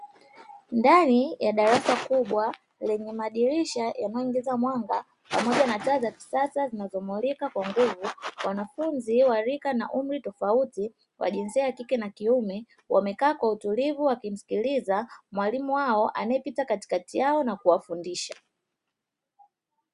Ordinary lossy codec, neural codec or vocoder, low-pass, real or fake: Opus, 64 kbps; none; 10.8 kHz; real